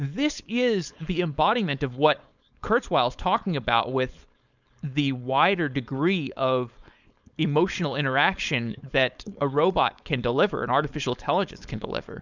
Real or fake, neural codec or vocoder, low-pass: fake; codec, 16 kHz, 4.8 kbps, FACodec; 7.2 kHz